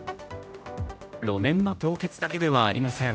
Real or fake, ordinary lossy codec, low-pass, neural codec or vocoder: fake; none; none; codec, 16 kHz, 0.5 kbps, X-Codec, HuBERT features, trained on general audio